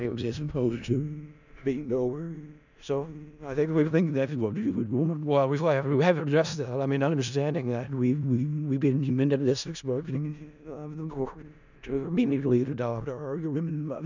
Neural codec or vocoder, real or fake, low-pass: codec, 16 kHz in and 24 kHz out, 0.4 kbps, LongCat-Audio-Codec, four codebook decoder; fake; 7.2 kHz